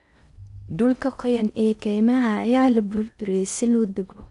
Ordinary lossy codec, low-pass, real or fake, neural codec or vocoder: none; 10.8 kHz; fake; codec, 16 kHz in and 24 kHz out, 0.6 kbps, FocalCodec, streaming, 4096 codes